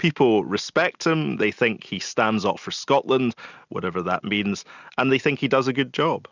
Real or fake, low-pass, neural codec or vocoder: real; 7.2 kHz; none